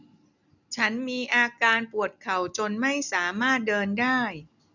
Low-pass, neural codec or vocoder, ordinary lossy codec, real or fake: 7.2 kHz; none; none; real